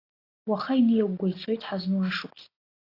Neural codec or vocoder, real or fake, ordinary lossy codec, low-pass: none; real; AAC, 24 kbps; 5.4 kHz